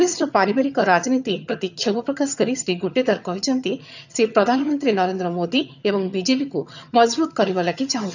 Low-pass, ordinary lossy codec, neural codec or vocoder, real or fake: 7.2 kHz; none; vocoder, 22.05 kHz, 80 mel bands, HiFi-GAN; fake